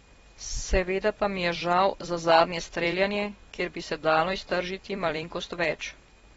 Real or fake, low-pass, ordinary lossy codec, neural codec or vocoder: fake; 19.8 kHz; AAC, 24 kbps; vocoder, 44.1 kHz, 128 mel bands every 256 samples, BigVGAN v2